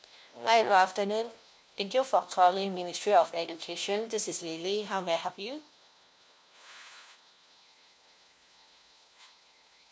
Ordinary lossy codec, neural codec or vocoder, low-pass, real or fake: none; codec, 16 kHz, 1 kbps, FunCodec, trained on LibriTTS, 50 frames a second; none; fake